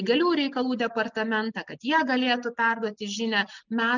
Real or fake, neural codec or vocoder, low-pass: real; none; 7.2 kHz